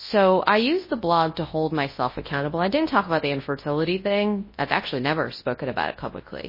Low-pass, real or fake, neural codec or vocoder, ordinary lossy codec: 5.4 kHz; fake; codec, 24 kHz, 0.9 kbps, WavTokenizer, large speech release; MP3, 24 kbps